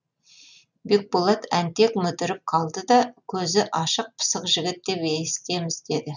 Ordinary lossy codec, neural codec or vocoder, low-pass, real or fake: none; none; 7.2 kHz; real